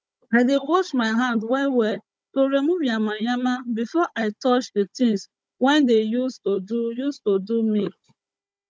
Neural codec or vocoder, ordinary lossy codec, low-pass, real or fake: codec, 16 kHz, 16 kbps, FunCodec, trained on Chinese and English, 50 frames a second; none; none; fake